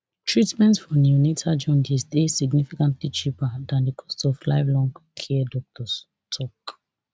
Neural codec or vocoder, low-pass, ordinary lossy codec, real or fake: none; none; none; real